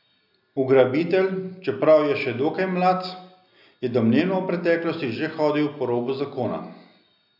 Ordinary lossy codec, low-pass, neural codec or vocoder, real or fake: none; 5.4 kHz; none; real